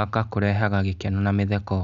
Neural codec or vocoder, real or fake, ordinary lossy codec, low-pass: none; real; none; 7.2 kHz